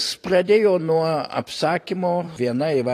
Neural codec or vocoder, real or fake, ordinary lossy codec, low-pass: vocoder, 44.1 kHz, 128 mel bands every 512 samples, BigVGAN v2; fake; AAC, 48 kbps; 14.4 kHz